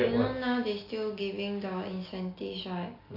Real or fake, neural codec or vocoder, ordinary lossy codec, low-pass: real; none; none; 5.4 kHz